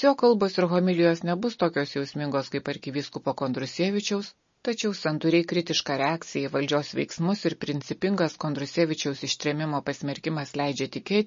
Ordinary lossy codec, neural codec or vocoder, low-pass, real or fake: MP3, 32 kbps; none; 7.2 kHz; real